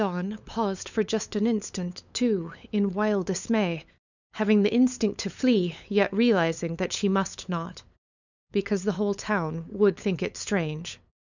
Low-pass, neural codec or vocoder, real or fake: 7.2 kHz; codec, 16 kHz, 8 kbps, FunCodec, trained on LibriTTS, 25 frames a second; fake